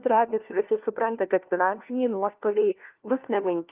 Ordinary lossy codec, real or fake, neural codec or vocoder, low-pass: Opus, 24 kbps; fake; codec, 16 kHz, 1 kbps, FunCodec, trained on LibriTTS, 50 frames a second; 3.6 kHz